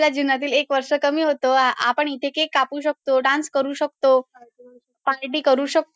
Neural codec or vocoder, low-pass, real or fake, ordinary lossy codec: none; none; real; none